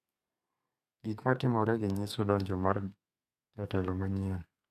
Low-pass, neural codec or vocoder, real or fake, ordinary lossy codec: 14.4 kHz; codec, 32 kHz, 1.9 kbps, SNAC; fake; none